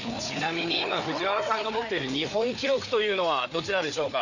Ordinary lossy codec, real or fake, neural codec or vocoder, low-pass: none; fake; codec, 16 kHz, 4 kbps, FreqCodec, larger model; 7.2 kHz